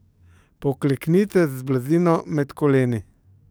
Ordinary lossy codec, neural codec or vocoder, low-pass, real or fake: none; codec, 44.1 kHz, 7.8 kbps, DAC; none; fake